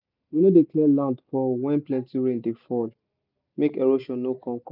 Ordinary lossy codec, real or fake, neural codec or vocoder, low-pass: none; real; none; 5.4 kHz